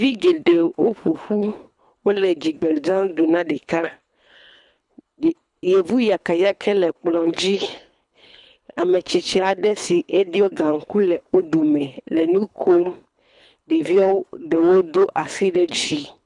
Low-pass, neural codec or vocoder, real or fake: 10.8 kHz; codec, 24 kHz, 3 kbps, HILCodec; fake